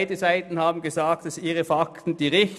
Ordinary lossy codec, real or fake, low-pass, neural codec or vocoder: none; real; none; none